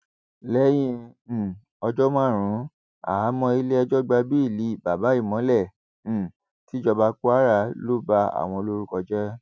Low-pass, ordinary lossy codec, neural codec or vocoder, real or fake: none; none; none; real